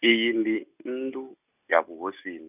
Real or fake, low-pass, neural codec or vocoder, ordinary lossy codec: real; 3.6 kHz; none; none